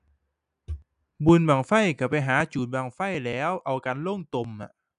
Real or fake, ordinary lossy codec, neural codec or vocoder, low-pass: real; none; none; 10.8 kHz